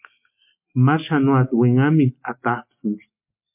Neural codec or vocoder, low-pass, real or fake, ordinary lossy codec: none; 3.6 kHz; real; MP3, 32 kbps